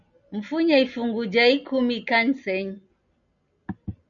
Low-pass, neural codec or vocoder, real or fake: 7.2 kHz; none; real